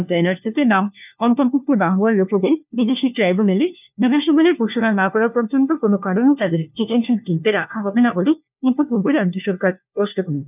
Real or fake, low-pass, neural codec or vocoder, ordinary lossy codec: fake; 3.6 kHz; codec, 16 kHz, 1 kbps, FunCodec, trained on LibriTTS, 50 frames a second; none